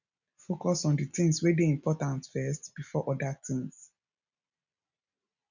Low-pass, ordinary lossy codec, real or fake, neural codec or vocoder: 7.2 kHz; none; real; none